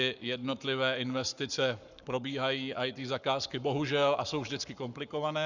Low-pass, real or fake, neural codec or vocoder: 7.2 kHz; fake; codec, 44.1 kHz, 7.8 kbps, Pupu-Codec